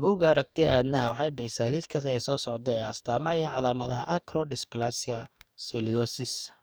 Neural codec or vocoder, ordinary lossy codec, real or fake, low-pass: codec, 44.1 kHz, 2.6 kbps, DAC; none; fake; none